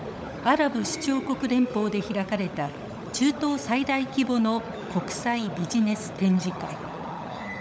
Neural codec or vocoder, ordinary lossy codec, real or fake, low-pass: codec, 16 kHz, 16 kbps, FunCodec, trained on LibriTTS, 50 frames a second; none; fake; none